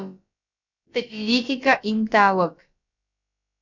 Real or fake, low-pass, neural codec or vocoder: fake; 7.2 kHz; codec, 16 kHz, about 1 kbps, DyCAST, with the encoder's durations